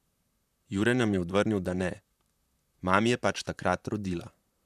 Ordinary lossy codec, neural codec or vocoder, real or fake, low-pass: none; vocoder, 44.1 kHz, 128 mel bands, Pupu-Vocoder; fake; 14.4 kHz